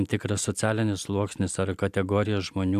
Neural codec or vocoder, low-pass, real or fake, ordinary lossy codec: none; 14.4 kHz; real; AAC, 96 kbps